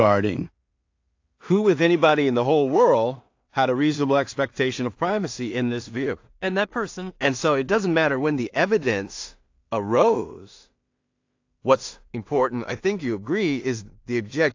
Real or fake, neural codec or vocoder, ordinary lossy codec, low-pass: fake; codec, 16 kHz in and 24 kHz out, 0.4 kbps, LongCat-Audio-Codec, two codebook decoder; AAC, 48 kbps; 7.2 kHz